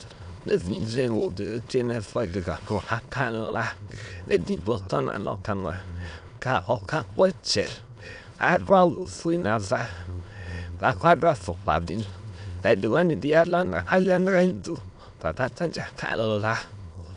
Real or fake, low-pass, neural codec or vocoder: fake; 9.9 kHz; autoencoder, 22.05 kHz, a latent of 192 numbers a frame, VITS, trained on many speakers